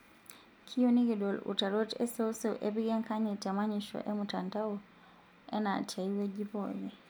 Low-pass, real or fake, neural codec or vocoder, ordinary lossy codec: none; real; none; none